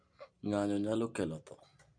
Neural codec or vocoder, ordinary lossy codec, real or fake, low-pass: none; none; real; 9.9 kHz